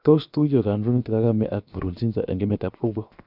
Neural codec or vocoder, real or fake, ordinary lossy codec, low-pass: codec, 16 kHz, 0.7 kbps, FocalCodec; fake; none; 5.4 kHz